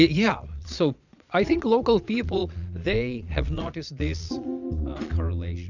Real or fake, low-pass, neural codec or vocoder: fake; 7.2 kHz; vocoder, 22.05 kHz, 80 mel bands, Vocos